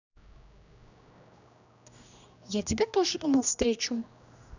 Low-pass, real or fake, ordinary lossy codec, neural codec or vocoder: 7.2 kHz; fake; none; codec, 16 kHz, 1 kbps, X-Codec, HuBERT features, trained on general audio